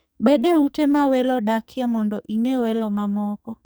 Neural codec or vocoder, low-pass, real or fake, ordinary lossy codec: codec, 44.1 kHz, 2.6 kbps, SNAC; none; fake; none